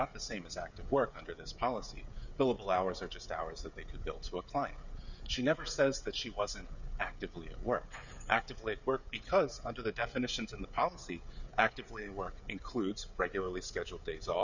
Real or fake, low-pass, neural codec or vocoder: fake; 7.2 kHz; codec, 16 kHz, 16 kbps, FreqCodec, smaller model